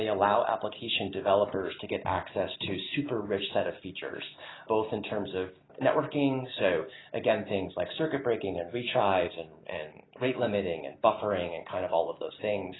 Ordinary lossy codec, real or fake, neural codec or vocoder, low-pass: AAC, 16 kbps; real; none; 7.2 kHz